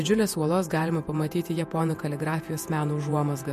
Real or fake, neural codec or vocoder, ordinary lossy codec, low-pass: fake; vocoder, 48 kHz, 128 mel bands, Vocos; MP3, 64 kbps; 14.4 kHz